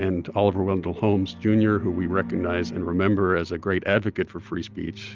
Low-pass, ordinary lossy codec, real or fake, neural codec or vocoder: 7.2 kHz; Opus, 32 kbps; real; none